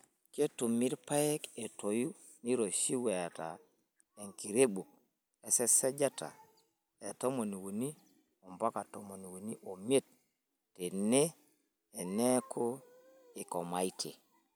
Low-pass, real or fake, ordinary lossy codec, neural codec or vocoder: none; real; none; none